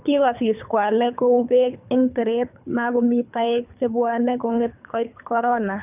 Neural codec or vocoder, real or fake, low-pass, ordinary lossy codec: codec, 24 kHz, 3 kbps, HILCodec; fake; 3.6 kHz; none